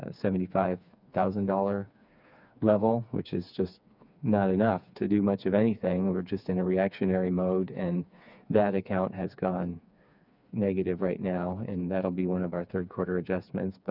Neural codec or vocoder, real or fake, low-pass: codec, 16 kHz, 4 kbps, FreqCodec, smaller model; fake; 5.4 kHz